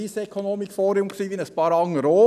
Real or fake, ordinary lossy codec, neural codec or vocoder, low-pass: real; none; none; 14.4 kHz